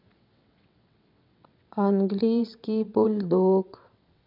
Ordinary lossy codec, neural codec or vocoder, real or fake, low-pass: MP3, 48 kbps; vocoder, 22.05 kHz, 80 mel bands, WaveNeXt; fake; 5.4 kHz